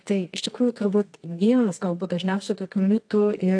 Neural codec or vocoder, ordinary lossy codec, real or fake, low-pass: codec, 24 kHz, 0.9 kbps, WavTokenizer, medium music audio release; AAC, 48 kbps; fake; 9.9 kHz